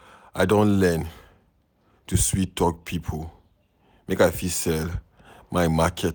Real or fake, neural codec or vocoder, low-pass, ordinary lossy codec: real; none; none; none